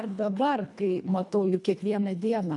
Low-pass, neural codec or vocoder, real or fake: 10.8 kHz; codec, 24 kHz, 1.5 kbps, HILCodec; fake